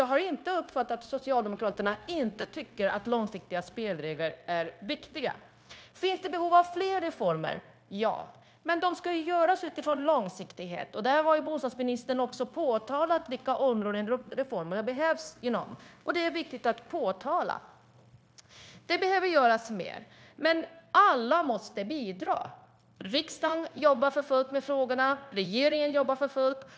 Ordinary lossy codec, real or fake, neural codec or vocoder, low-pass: none; fake; codec, 16 kHz, 0.9 kbps, LongCat-Audio-Codec; none